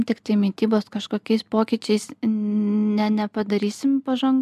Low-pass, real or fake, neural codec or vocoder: 14.4 kHz; real; none